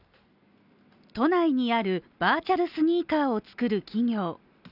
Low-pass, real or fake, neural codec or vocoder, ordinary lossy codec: 5.4 kHz; real; none; none